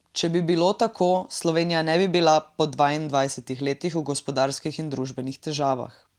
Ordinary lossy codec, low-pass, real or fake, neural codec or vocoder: Opus, 24 kbps; 19.8 kHz; real; none